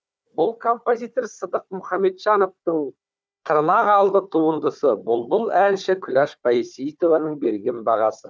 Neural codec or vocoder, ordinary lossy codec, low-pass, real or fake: codec, 16 kHz, 4 kbps, FunCodec, trained on Chinese and English, 50 frames a second; none; none; fake